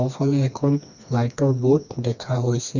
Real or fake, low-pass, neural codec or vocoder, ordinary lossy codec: fake; 7.2 kHz; codec, 16 kHz, 2 kbps, FreqCodec, smaller model; none